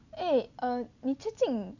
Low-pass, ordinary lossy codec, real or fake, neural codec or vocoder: 7.2 kHz; none; real; none